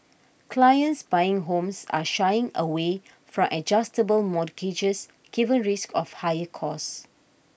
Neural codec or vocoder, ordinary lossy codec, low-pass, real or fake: none; none; none; real